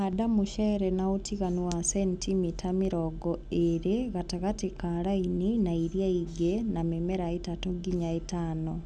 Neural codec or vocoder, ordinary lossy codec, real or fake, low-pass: none; none; real; none